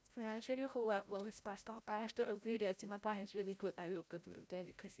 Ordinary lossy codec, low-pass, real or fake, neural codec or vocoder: none; none; fake; codec, 16 kHz, 0.5 kbps, FreqCodec, larger model